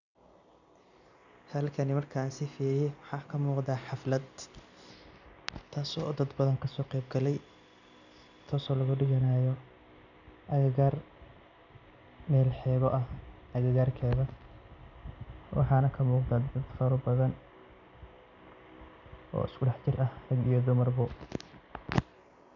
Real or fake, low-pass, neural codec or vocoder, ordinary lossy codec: real; 7.2 kHz; none; none